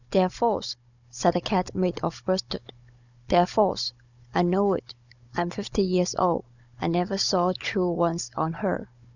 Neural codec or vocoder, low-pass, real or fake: codec, 16 kHz, 16 kbps, FunCodec, trained on Chinese and English, 50 frames a second; 7.2 kHz; fake